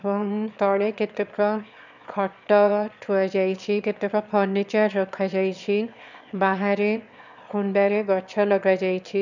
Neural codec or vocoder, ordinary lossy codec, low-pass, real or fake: autoencoder, 22.05 kHz, a latent of 192 numbers a frame, VITS, trained on one speaker; none; 7.2 kHz; fake